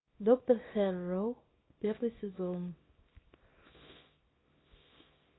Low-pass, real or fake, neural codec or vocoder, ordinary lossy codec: 7.2 kHz; fake; codec, 24 kHz, 0.9 kbps, WavTokenizer, small release; AAC, 16 kbps